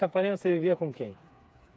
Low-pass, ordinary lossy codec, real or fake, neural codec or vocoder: none; none; fake; codec, 16 kHz, 4 kbps, FreqCodec, smaller model